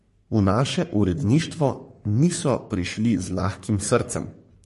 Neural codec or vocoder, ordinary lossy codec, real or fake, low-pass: codec, 44.1 kHz, 3.4 kbps, Pupu-Codec; MP3, 48 kbps; fake; 14.4 kHz